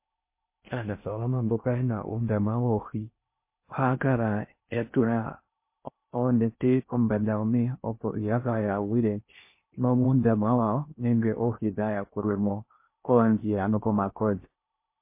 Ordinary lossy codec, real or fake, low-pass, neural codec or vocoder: MP3, 24 kbps; fake; 3.6 kHz; codec, 16 kHz in and 24 kHz out, 0.6 kbps, FocalCodec, streaming, 4096 codes